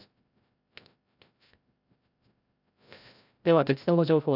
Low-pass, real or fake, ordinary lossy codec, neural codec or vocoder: 5.4 kHz; fake; none; codec, 16 kHz, 0.5 kbps, FreqCodec, larger model